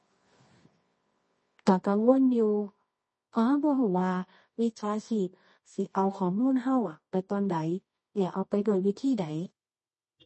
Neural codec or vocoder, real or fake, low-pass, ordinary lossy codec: codec, 24 kHz, 0.9 kbps, WavTokenizer, medium music audio release; fake; 10.8 kHz; MP3, 32 kbps